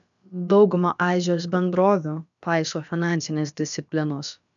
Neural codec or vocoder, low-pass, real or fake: codec, 16 kHz, about 1 kbps, DyCAST, with the encoder's durations; 7.2 kHz; fake